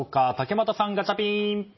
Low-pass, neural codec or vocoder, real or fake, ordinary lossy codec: 7.2 kHz; none; real; MP3, 24 kbps